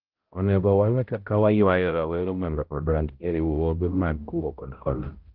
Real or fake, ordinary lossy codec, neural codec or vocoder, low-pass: fake; Opus, 16 kbps; codec, 16 kHz, 0.5 kbps, X-Codec, HuBERT features, trained on balanced general audio; 5.4 kHz